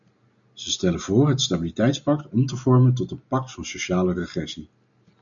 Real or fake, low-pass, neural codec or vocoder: real; 7.2 kHz; none